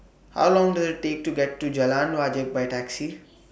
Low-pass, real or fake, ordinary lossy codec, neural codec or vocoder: none; real; none; none